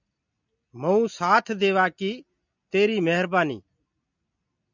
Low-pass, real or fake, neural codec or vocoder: 7.2 kHz; real; none